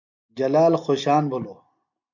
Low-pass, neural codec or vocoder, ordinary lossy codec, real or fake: 7.2 kHz; none; MP3, 64 kbps; real